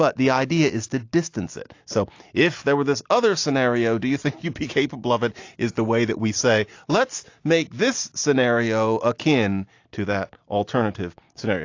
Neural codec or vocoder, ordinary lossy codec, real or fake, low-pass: none; AAC, 48 kbps; real; 7.2 kHz